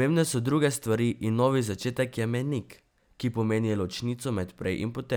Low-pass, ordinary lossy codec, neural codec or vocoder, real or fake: none; none; none; real